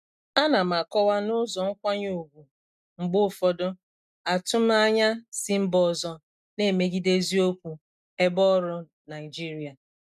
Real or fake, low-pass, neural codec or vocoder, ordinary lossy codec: real; 14.4 kHz; none; none